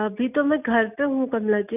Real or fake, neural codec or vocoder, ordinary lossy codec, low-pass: real; none; none; 3.6 kHz